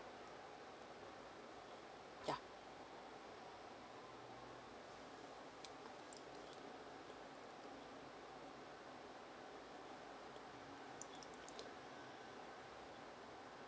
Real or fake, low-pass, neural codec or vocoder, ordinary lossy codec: real; none; none; none